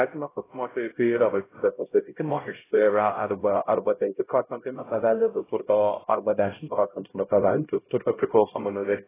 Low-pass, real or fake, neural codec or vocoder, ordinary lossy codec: 3.6 kHz; fake; codec, 16 kHz, 0.5 kbps, X-Codec, HuBERT features, trained on LibriSpeech; AAC, 16 kbps